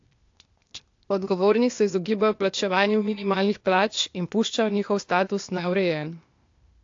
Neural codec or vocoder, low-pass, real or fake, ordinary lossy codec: codec, 16 kHz, 0.8 kbps, ZipCodec; 7.2 kHz; fake; AAC, 48 kbps